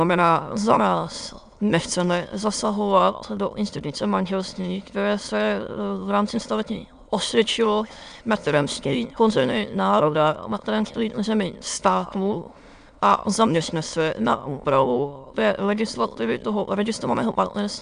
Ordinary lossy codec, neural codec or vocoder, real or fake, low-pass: Opus, 64 kbps; autoencoder, 22.05 kHz, a latent of 192 numbers a frame, VITS, trained on many speakers; fake; 9.9 kHz